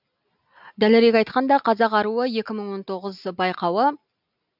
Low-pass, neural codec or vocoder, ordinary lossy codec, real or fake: 5.4 kHz; none; none; real